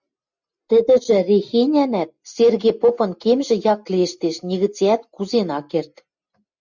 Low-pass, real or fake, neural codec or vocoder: 7.2 kHz; real; none